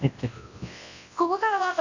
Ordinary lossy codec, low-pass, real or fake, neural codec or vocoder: none; 7.2 kHz; fake; codec, 24 kHz, 0.9 kbps, WavTokenizer, large speech release